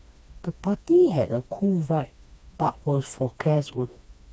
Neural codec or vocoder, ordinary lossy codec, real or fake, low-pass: codec, 16 kHz, 2 kbps, FreqCodec, smaller model; none; fake; none